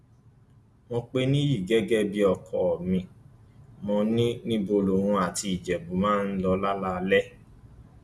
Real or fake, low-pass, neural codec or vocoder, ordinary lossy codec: real; none; none; none